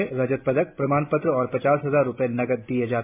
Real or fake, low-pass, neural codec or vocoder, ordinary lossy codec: real; 3.6 kHz; none; MP3, 32 kbps